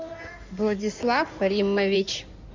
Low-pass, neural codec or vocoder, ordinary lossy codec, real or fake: 7.2 kHz; codec, 16 kHz in and 24 kHz out, 2.2 kbps, FireRedTTS-2 codec; MP3, 64 kbps; fake